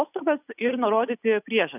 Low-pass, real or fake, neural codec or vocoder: 3.6 kHz; real; none